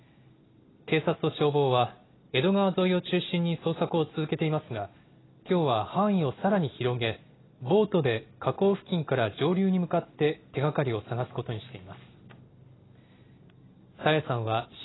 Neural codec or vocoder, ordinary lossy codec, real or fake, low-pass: none; AAC, 16 kbps; real; 7.2 kHz